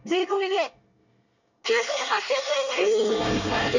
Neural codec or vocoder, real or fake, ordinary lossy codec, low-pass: codec, 24 kHz, 1 kbps, SNAC; fake; none; 7.2 kHz